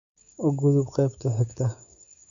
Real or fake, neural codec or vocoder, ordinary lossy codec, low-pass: fake; codec, 16 kHz, 6 kbps, DAC; none; 7.2 kHz